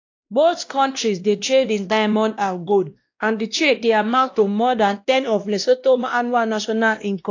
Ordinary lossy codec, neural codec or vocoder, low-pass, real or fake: AAC, 48 kbps; codec, 16 kHz, 1 kbps, X-Codec, WavLM features, trained on Multilingual LibriSpeech; 7.2 kHz; fake